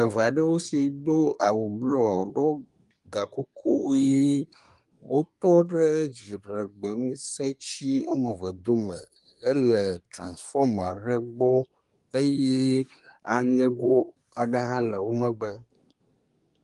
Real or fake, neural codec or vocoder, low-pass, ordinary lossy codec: fake; codec, 24 kHz, 1 kbps, SNAC; 10.8 kHz; Opus, 32 kbps